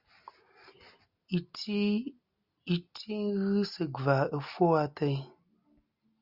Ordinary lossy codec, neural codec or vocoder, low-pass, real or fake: Opus, 64 kbps; none; 5.4 kHz; real